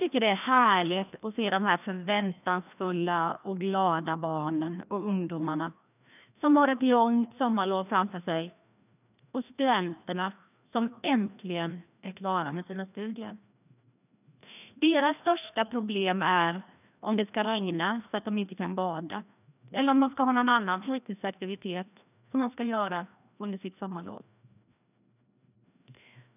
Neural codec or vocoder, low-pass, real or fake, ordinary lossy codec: codec, 16 kHz, 1 kbps, FreqCodec, larger model; 3.6 kHz; fake; none